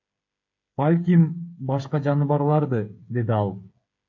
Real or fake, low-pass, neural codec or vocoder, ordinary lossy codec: fake; 7.2 kHz; codec, 16 kHz, 8 kbps, FreqCodec, smaller model; AAC, 48 kbps